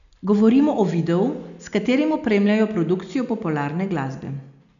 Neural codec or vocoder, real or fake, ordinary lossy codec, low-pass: none; real; none; 7.2 kHz